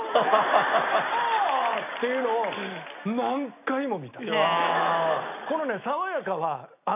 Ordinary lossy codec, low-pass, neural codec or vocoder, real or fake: none; 3.6 kHz; none; real